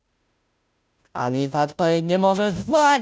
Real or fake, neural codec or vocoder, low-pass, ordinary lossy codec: fake; codec, 16 kHz, 0.5 kbps, FunCodec, trained on Chinese and English, 25 frames a second; none; none